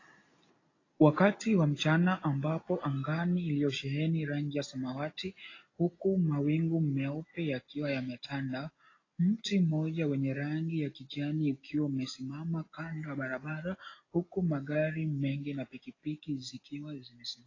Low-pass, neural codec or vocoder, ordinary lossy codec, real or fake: 7.2 kHz; none; AAC, 32 kbps; real